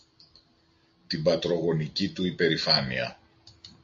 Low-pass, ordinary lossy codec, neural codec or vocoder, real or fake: 7.2 kHz; AAC, 64 kbps; none; real